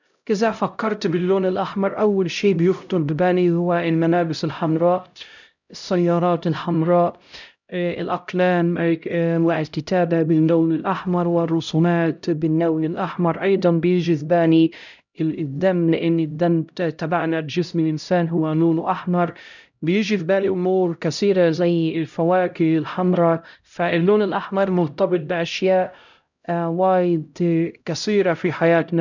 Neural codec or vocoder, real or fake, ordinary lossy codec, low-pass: codec, 16 kHz, 0.5 kbps, X-Codec, HuBERT features, trained on LibriSpeech; fake; none; 7.2 kHz